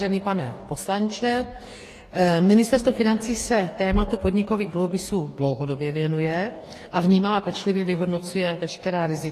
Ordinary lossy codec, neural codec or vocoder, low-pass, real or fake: AAC, 48 kbps; codec, 44.1 kHz, 2.6 kbps, DAC; 14.4 kHz; fake